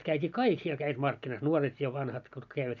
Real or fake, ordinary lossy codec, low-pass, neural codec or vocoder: real; none; 7.2 kHz; none